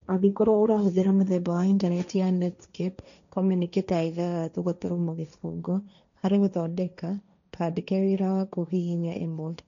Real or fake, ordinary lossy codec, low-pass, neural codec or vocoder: fake; none; 7.2 kHz; codec, 16 kHz, 1.1 kbps, Voila-Tokenizer